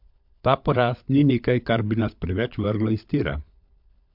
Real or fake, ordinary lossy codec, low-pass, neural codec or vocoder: fake; MP3, 48 kbps; 5.4 kHz; codec, 16 kHz, 16 kbps, FunCodec, trained on LibriTTS, 50 frames a second